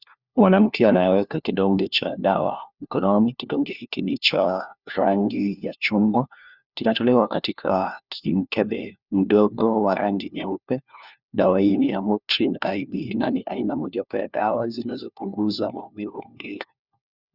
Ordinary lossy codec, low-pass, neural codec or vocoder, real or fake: Opus, 64 kbps; 5.4 kHz; codec, 16 kHz, 1 kbps, FunCodec, trained on LibriTTS, 50 frames a second; fake